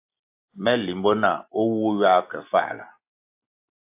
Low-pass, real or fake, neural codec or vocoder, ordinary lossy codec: 3.6 kHz; real; none; AAC, 32 kbps